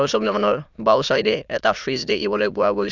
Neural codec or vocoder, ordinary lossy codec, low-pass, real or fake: autoencoder, 22.05 kHz, a latent of 192 numbers a frame, VITS, trained on many speakers; none; 7.2 kHz; fake